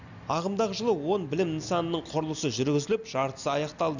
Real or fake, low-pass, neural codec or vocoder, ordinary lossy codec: real; 7.2 kHz; none; none